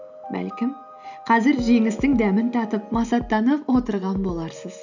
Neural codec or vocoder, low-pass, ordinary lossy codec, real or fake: none; 7.2 kHz; none; real